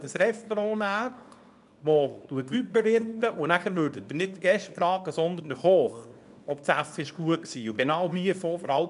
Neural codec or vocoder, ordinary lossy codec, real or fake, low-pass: codec, 24 kHz, 0.9 kbps, WavTokenizer, small release; none; fake; 10.8 kHz